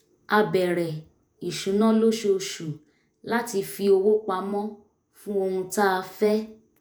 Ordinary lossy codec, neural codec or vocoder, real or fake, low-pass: none; vocoder, 48 kHz, 128 mel bands, Vocos; fake; none